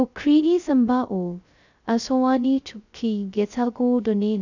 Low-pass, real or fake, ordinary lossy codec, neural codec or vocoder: 7.2 kHz; fake; none; codec, 16 kHz, 0.2 kbps, FocalCodec